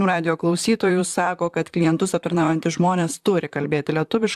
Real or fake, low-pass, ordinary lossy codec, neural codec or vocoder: fake; 14.4 kHz; AAC, 96 kbps; vocoder, 44.1 kHz, 128 mel bands, Pupu-Vocoder